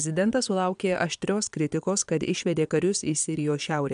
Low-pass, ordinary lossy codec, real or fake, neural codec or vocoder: 9.9 kHz; AAC, 96 kbps; fake; vocoder, 22.05 kHz, 80 mel bands, Vocos